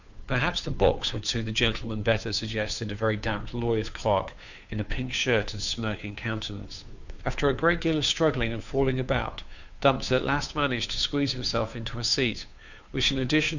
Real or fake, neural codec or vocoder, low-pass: fake; codec, 16 kHz, 2 kbps, FunCodec, trained on Chinese and English, 25 frames a second; 7.2 kHz